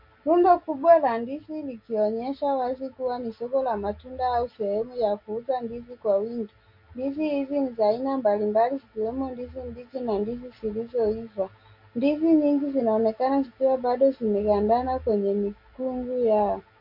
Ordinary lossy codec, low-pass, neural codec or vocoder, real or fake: MP3, 32 kbps; 5.4 kHz; none; real